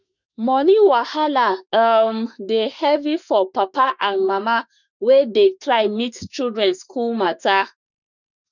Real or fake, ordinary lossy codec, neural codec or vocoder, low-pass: fake; none; autoencoder, 48 kHz, 32 numbers a frame, DAC-VAE, trained on Japanese speech; 7.2 kHz